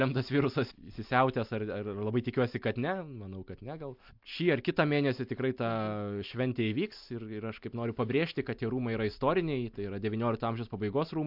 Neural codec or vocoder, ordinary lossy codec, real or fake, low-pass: none; MP3, 48 kbps; real; 5.4 kHz